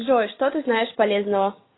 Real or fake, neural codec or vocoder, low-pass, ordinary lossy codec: real; none; 7.2 kHz; AAC, 16 kbps